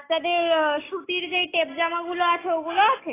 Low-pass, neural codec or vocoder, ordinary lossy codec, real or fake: 3.6 kHz; none; AAC, 16 kbps; real